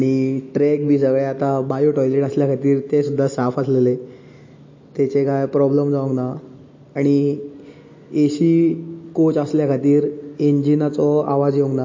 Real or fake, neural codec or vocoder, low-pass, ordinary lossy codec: real; none; 7.2 kHz; MP3, 32 kbps